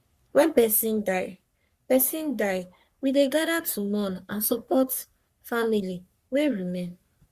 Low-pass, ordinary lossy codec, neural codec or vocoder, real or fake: 14.4 kHz; Opus, 64 kbps; codec, 44.1 kHz, 3.4 kbps, Pupu-Codec; fake